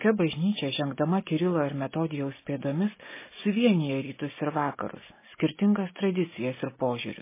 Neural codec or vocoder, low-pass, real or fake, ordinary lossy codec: none; 3.6 kHz; real; MP3, 16 kbps